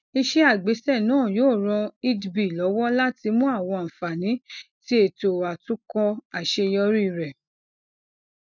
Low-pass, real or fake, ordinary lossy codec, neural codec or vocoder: 7.2 kHz; real; none; none